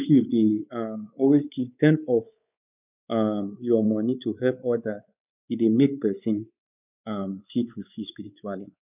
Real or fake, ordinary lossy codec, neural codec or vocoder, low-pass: fake; none; codec, 16 kHz, 4 kbps, X-Codec, WavLM features, trained on Multilingual LibriSpeech; 3.6 kHz